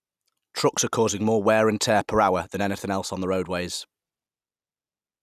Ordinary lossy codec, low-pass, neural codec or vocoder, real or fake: none; 14.4 kHz; none; real